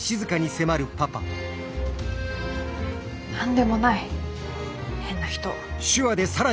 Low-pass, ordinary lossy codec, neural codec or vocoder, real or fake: none; none; none; real